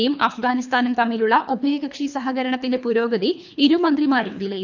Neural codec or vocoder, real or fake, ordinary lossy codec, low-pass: codec, 24 kHz, 3 kbps, HILCodec; fake; none; 7.2 kHz